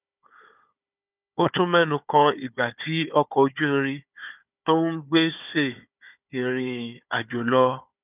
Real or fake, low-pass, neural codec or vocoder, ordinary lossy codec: fake; 3.6 kHz; codec, 16 kHz, 4 kbps, FunCodec, trained on Chinese and English, 50 frames a second; none